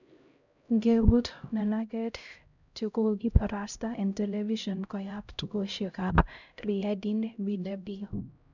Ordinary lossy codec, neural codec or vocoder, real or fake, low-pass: none; codec, 16 kHz, 0.5 kbps, X-Codec, HuBERT features, trained on LibriSpeech; fake; 7.2 kHz